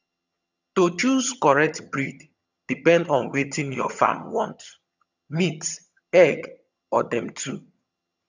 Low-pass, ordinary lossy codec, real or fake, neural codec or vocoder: 7.2 kHz; none; fake; vocoder, 22.05 kHz, 80 mel bands, HiFi-GAN